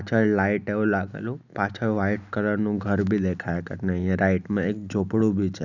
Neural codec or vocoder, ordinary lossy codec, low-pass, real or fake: none; none; 7.2 kHz; real